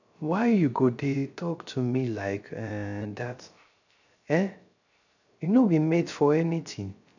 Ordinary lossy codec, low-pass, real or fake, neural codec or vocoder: none; 7.2 kHz; fake; codec, 16 kHz, 0.3 kbps, FocalCodec